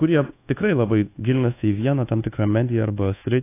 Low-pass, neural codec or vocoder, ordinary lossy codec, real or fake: 3.6 kHz; codec, 24 kHz, 1.2 kbps, DualCodec; AAC, 24 kbps; fake